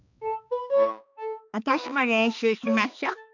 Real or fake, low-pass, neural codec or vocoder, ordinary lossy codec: fake; 7.2 kHz; codec, 16 kHz, 2 kbps, X-Codec, HuBERT features, trained on balanced general audio; none